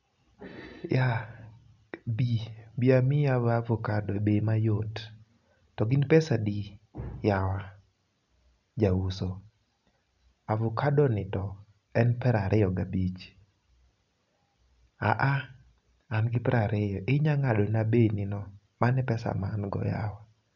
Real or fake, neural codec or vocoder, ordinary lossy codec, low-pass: real; none; none; 7.2 kHz